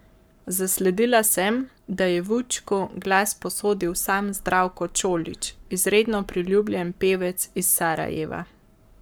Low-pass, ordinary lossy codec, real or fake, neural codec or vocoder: none; none; fake; codec, 44.1 kHz, 7.8 kbps, Pupu-Codec